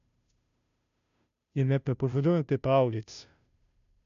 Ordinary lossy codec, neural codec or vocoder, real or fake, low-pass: none; codec, 16 kHz, 0.5 kbps, FunCodec, trained on Chinese and English, 25 frames a second; fake; 7.2 kHz